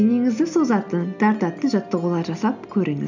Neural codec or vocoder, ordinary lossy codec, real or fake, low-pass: none; none; real; 7.2 kHz